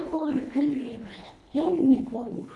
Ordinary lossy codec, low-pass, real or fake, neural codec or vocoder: none; none; fake; codec, 24 kHz, 1.5 kbps, HILCodec